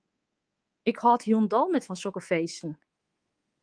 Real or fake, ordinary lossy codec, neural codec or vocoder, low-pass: fake; Opus, 24 kbps; codec, 24 kHz, 3.1 kbps, DualCodec; 9.9 kHz